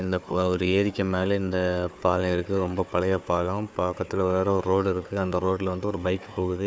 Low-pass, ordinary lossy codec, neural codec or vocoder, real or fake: none; none; codec, 16 kHz, 4 kbps, FunCodec, trained on Chinese and English, 50 frames a second; fake